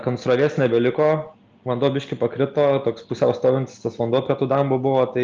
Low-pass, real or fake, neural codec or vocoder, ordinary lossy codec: 7.2 kHz; real; none; Opus, 24 kbps